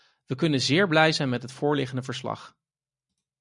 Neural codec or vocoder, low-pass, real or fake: none; 10.8 kHz; real